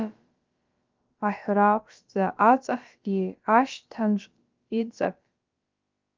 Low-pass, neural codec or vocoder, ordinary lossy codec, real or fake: 7.2 kHz; codec, 16 kHz, about 1 kbps, DyCAST, with the encoder's durations; Opus, 24 kbps; fake